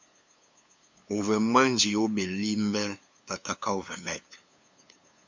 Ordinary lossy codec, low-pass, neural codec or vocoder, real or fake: MP3, 64 kbps; 7.2 kHz; codec, 16 kHz, 2 kbps, FunCodec, trained on LibriTTS, 25 frames a second; fake